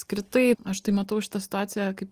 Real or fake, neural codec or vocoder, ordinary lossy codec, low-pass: real; none; Opus, 24 kbps; 14.4 kHz